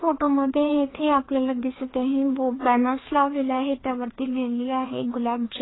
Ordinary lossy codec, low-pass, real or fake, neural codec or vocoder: AAC, 16 kbps; 7.2 kHz; fake; codec, 16 kHz, 2 kbps, FreqCodec, larger model